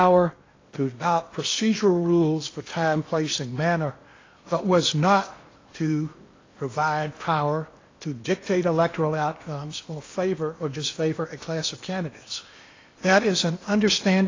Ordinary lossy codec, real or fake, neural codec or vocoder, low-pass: AAC, 32 kbps; fake; codec, 16 kHz in and 24 kHz out, 0.8 kbps, FocalCodec, streaming, 65536 codes; 7.2 kHz